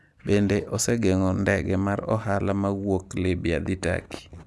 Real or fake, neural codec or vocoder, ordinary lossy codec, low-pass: real; none; none; none